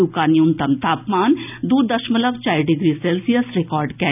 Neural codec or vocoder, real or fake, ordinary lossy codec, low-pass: none; real; none; 3.6 kHz